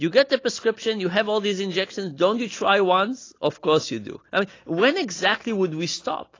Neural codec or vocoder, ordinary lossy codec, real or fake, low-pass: none; AAC, 32 kbps; real; 7.2 kHz